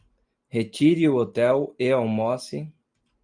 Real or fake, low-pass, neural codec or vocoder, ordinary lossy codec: real; 9.9 kHz; none; Opus, 32 kbps